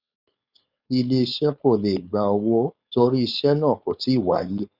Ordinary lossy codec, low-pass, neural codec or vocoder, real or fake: Opus, 64 kbps; 5.4 kHz; codec, 16 kHz, 4.8 kbps, FACodec; fake